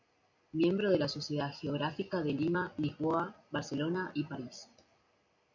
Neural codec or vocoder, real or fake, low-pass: none; real; 7.2 kHz